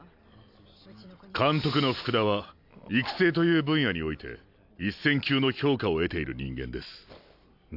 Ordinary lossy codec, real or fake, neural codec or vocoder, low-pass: AAC, 48 kbps; real; none; 5.4 kHz